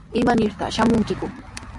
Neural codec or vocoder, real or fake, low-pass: none; real; 10.8 kHz